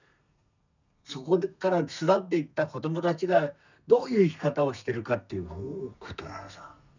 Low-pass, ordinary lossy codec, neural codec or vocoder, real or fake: 7.2 kHz; none; codec, 32 kHz, 1.9 kbps, SNAC; fake